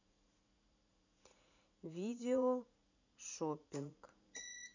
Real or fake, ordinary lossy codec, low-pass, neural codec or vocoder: fake; none; 7.2 kHz; vocoder, 44.1 kHz, 80 mel bands, Vocos